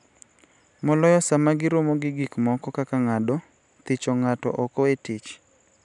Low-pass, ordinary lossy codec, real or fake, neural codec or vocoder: 10.8 kHz; none; real; none